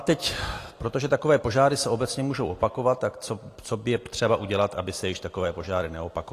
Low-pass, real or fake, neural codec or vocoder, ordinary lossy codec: 14.4 kHz; real; none; AAC, 48 kbps